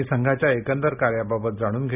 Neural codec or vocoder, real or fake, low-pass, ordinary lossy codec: none; real; 3.6 kHz; none